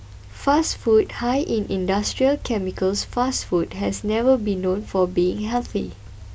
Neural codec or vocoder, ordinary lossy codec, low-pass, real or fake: none; none; none; real